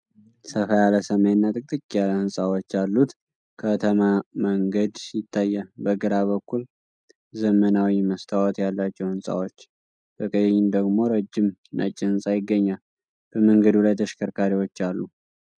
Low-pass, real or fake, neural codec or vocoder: 9.9 kHz; real; none